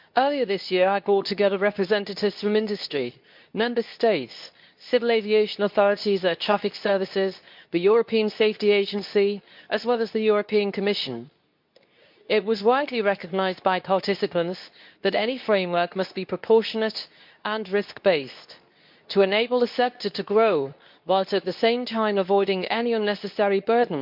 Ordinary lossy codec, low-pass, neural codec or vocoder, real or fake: MP3, 48 kbps; 5.4 kHz; codec, 24 kHz, 0.9 kbps, WavTokenizer, medium speech release version 2; fake